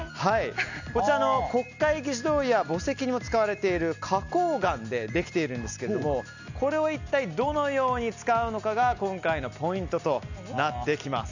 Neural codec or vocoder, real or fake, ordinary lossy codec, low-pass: none; real; none; 7.2 kHz